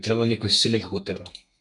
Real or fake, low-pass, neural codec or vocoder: fake; 10.8 kHz; codec, 32 kHz, 1.9 kbps, SNAC